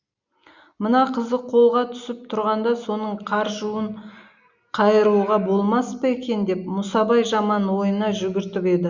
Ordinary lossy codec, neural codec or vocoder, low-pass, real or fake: Opus, 64 kbps; none; 7.2 kHz; real